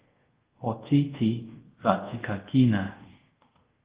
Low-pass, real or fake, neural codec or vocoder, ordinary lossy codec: 3.6 kHz; fake; codec, 24 kHz, 0.5 kbps, DualCodec; Opus, 16 kbps